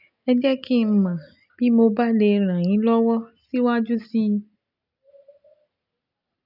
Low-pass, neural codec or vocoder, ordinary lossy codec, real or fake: 5.4 kHz; none; none; real